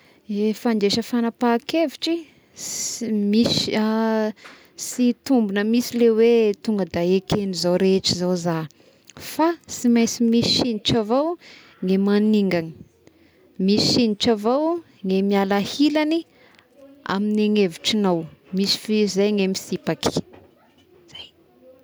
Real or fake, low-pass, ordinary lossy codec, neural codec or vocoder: real; none; none; none